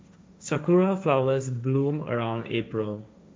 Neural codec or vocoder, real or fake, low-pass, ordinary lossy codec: codec, 16 kHz, 1.1 kbps, Voila-Tokenizer; fake; none; none